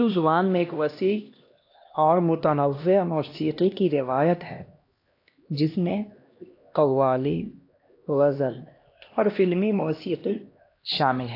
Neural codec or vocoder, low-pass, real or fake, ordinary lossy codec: codec, 16 kHz, 1 kbps, X-Codec, HuBERT features, trained on LibriSpeech; 5.4 kHz; fake; AAC, 32 kbps